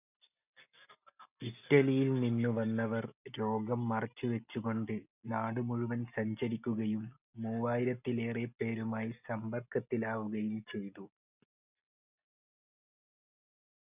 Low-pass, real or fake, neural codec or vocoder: 3.6 kHz; real; none